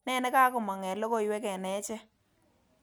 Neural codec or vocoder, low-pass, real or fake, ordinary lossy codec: none; none; real; none